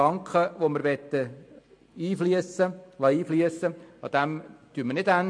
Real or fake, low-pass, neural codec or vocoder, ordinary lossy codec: real; 9.9 kHz; none; MP3, 48 kbps